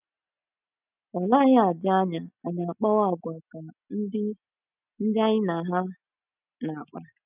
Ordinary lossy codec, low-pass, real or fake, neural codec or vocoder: none; 3.6 kHz; real; none